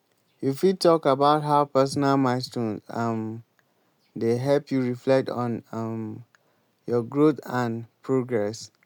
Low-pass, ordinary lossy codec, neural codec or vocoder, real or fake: 19.8 kHz; none; none; real